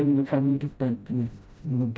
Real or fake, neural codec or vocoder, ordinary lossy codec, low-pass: fake; codec, 16 kHz, 0.5 kbps, FreqCodec, smaller model; none; none